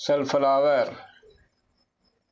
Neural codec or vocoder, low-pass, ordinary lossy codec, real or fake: none; none; none; real